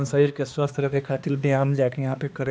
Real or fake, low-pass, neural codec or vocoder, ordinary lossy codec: fake; none; codec, 16 kHz, 2 kbps, X-Codec, HuBERT features, trained on balanced general audio; none